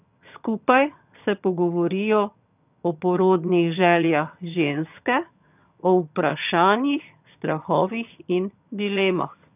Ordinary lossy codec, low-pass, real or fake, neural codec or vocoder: none; 3.6 kHz; fake; vocoder, 22.05 kHz, 80 mel bands, HiFi-GAN